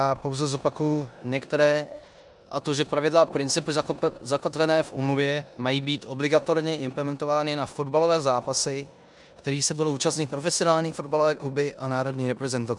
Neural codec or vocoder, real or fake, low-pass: codec, 16 kHz in and 24 kHz out, 0.9 kbps, LongCat-Audio-Codec, four codebook decoder; fake; 10.8 kHz